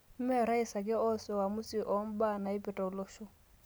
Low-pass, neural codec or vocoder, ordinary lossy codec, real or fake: none; none; none; real